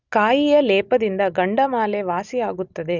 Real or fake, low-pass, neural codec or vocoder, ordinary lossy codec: real; 7.2 kHz; none; none